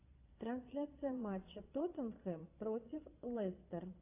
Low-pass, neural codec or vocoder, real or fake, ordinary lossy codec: 3.6 kHz; vocoder, 22.05 kHz, 80 mel bands, Vocos; fake; AAC, 24 kbps